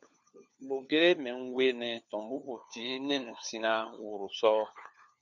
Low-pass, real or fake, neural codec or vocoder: 7.2 kHz; fake; codec, 16 kHz, 4 kbps, FunCodec, trained on LibriTTS, 50 frames a second